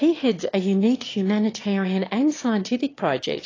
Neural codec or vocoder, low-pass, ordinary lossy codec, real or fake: autoencoder, 22.05 kHz, a latent of 192 numbers a frame, VITS, trained on one speaker; 7.2 kHz; AAC, 32 kbps; fake